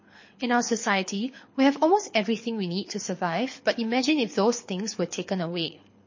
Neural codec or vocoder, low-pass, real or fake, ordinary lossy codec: codec, 24 kHz, 6 kbps, HILCodec; 7.2 kHz; fake; MP3, 32 kbps